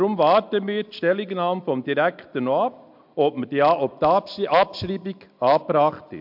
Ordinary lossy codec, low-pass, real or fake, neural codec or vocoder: MP3, 48 kbps; 5.4 kHz; real; none